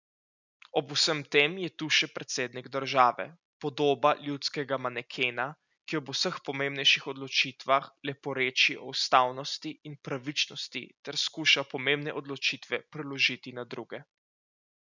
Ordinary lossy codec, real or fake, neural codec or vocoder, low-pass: none; real; none; 7.2 kHz